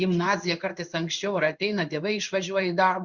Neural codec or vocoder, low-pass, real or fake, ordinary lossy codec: codec, 16 kHz in and 24 kHz out, 1 kbps, XY-Tokenizer; 7.2 kHz; fake; Opus, 64 kbps